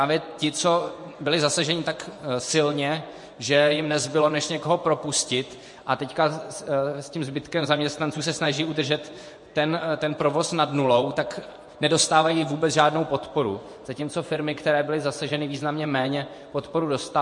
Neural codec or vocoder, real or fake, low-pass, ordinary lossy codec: vocoder, 24 kHz, 100 mel bands, Vocos; fake; 10.8 kHz; MP3, 48 kbps